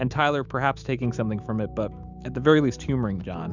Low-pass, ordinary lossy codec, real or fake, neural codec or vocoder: 7.2 kHz; Opus, 64 kbps; fake; autoencoder, 48 kHz, 128 numbers a frame, DAC-VAE, trained on Japanese speech